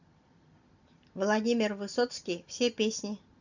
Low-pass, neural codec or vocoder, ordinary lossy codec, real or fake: 7.2 kHz; none; none; real